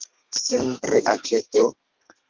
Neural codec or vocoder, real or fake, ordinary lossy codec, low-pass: codec, 32 kHz, 1.9 kbps, SNAC; fake; Opus, 24 kbps; 7.2 kHz